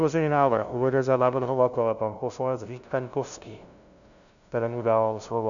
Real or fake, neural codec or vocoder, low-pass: fake; codec, 16 kHz, 0.5 kbps, FunCodec, trained on LibriTTS, 25 frames a second; 7.2 kHz